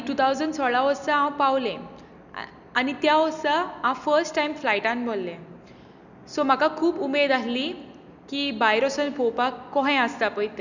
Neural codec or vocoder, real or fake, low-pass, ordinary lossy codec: none; real; 7.2 kHz; none